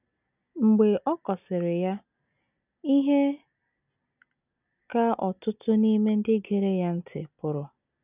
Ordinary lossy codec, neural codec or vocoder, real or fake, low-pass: none; none; real; 3.6 kHz